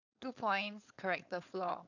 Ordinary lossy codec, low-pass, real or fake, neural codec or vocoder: none; 7.2 kHz; fake; codec, 16 kHz, 4.8 kbps, FACodec